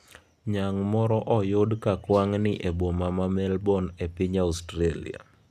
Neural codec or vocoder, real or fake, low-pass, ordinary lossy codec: none; real; 14.4 kHz; none